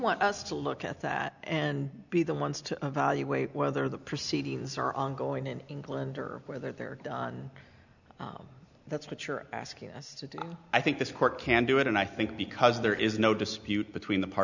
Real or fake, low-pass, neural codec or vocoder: real; 7.2 kHz; none